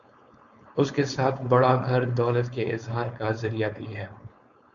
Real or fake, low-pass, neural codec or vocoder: fake; 7.2 kHz; codec, 16 kHz, 4.8 kbps, FACodec